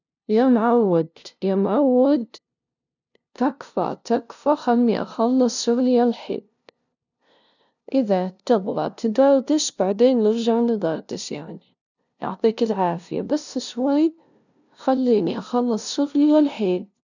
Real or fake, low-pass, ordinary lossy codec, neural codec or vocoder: fake; 7.2 kHz; none; codec, 16 kHz, 0.5 kbps, FunCodec, trained on LibriTTS, 25 frames a second